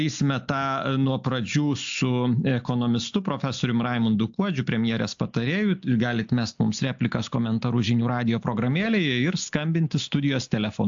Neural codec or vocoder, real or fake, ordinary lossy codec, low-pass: none; real; AAC, 64 kbps; 7.2 kHz